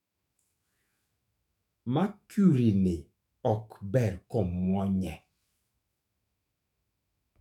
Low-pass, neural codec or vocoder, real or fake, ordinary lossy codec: 19.8 kHz; autoencoder, 48 kHz, 128 numbers a frame, DAC-VAE, trained on Japanese speech; fake; none